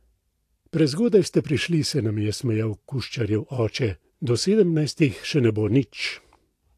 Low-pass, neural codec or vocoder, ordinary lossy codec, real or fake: 14.4 kHz; none; AAC, 64 kbps; real